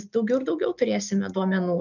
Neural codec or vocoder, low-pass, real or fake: none; 7.2 kHz; real